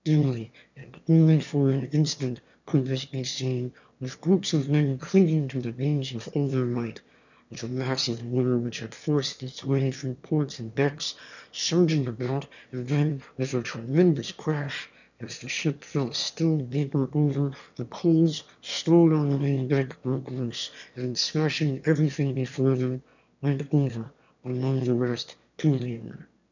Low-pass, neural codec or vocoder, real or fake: 7.2 kHz; autoencoder, 22.05 kHz, a latent of 192 numbers a frame, VITS, trained on one speaker; fake